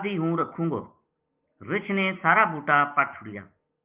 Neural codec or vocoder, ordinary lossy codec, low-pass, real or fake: none; Opus, 32 kbps; 3.6 kHz; real